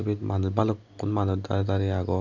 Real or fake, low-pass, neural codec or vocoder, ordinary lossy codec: real; 7.2 kHz; none; none